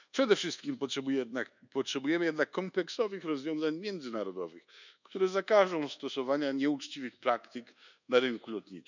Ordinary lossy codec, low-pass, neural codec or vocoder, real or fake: none; 7.2 kHz; codec, 24 kHz, 1.2 kbps, DualCodec; fake